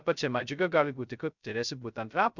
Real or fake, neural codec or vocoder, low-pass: fake; codec, 16 kHz, 0.2 kbps, FocalCodec; 7.2 kHz